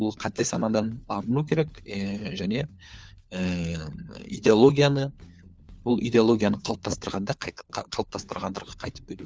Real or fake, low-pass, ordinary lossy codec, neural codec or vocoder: fake; none; none; codec, 16 kHz, 4 kbps, FunCodec, trained on LibriTTS, 50 frames a second